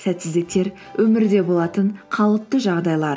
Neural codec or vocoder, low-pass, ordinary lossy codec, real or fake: none; none; none; real